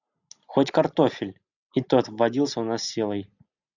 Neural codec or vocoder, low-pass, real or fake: none; 7.2 kHz; real